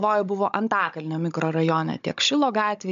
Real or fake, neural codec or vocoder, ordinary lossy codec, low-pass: fake; codec, 16 kHz, 16 kbps, FunCodec, trained on Chinese and English, 50 frames a second; MP3, 64 kbps; 7.2 kHz